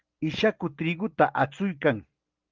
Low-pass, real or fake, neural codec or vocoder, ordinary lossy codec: 7.2 kHz; real; none; Opus, 32 kbps